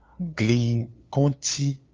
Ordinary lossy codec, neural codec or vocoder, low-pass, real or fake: Opus, 24 kbps; codec, 16 kHz, 2 kbps, FunCodec, trained on LibriTTS, 25 frames a second; 7.2 kHz; fake